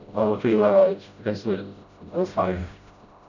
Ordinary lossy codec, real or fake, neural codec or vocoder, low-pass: none; fake; codec, 16 kHz, 0.5 kbps, FreqCodec, smaller model; 7.2 kHz